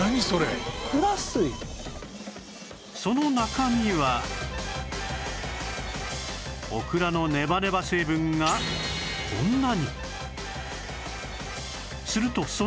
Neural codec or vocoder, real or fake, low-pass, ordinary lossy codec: none; real; none; none